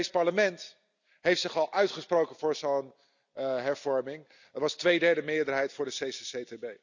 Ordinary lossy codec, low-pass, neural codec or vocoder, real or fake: none; 7.2 kHz; none; real